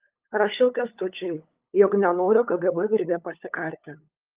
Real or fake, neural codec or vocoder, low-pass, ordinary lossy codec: fake; codec, 16 kHz, 8 kbps, FunCodec, trained on LibriTTS, 25 frames a second; 3.6 kHz; Opus, 24 kbps